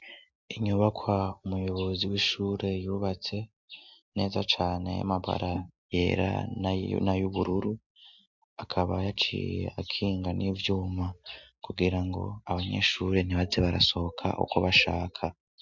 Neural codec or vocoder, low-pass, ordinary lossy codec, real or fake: none; 7.2 kHz; MP3, 64 kbps; real